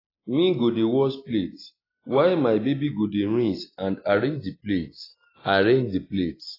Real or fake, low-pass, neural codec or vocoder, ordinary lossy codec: real; 5.4 kHz; none; AAC, 24 kbps